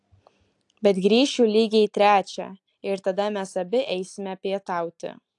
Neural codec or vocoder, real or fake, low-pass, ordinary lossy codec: none; real; 10.8 kHz; AAC, 64 kbps